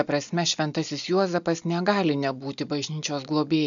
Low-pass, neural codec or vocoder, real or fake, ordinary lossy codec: 7.2 kHz; none; real; MP3, 96 kbps